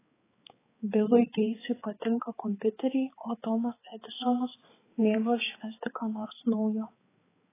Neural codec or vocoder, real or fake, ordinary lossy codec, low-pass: codec, 16 kHz, 4 kbps, X-Codec, HuBERT features, trained on balanced general audio; fake; AAC, 16 kbps; 3.6 kHz